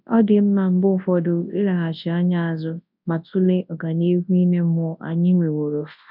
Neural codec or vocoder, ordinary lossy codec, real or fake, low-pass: codec, 24 kHz, 0.9 kbps, WavTokenizer, large speech release; MP3, 48 kbps; fake; 5.4 kHz